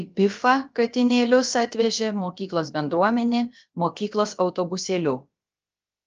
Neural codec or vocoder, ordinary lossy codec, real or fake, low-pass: codec, 16 kHz, about 1 kbps, DyCAST, with the encoder's durations; Opus, 24 kbps; fake; 7.2 kHz